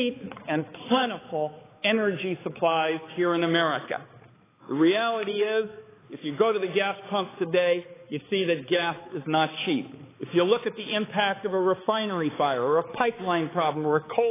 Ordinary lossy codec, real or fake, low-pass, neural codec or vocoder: AAC, 16 kbps; fake; 3.6 kHz; codec, 16 kHz, 4 kbps, X-Codec, HuBERT features, trained on balanced general audio